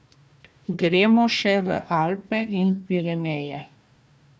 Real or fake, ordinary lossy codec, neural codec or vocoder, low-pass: fake; none; codec, 16 kHz, 1 kbps, FunCodec, trained on Chinese and English, 50 frames a second; none